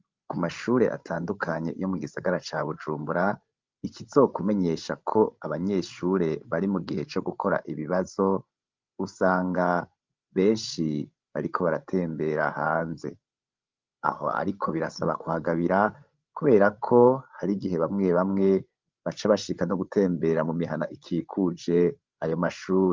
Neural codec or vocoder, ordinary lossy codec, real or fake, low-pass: codec, 16 kHz, 16 kbps, FreqCodec, larger model; Opus, 32 kbps; fake; 7.2 kHz